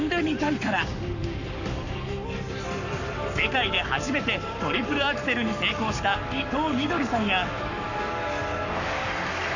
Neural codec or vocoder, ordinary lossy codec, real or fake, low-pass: codec, 44.1 kHz, 7.8 kbps, Pupu-Codec; none; fake; 7.2 kHz